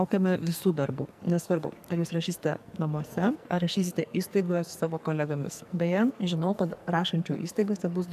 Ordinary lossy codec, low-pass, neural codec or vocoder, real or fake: AAC, 96 kbps; 14.4 kHz; codec, 44.1 kHz, 2.6 kbps, SNAC; fake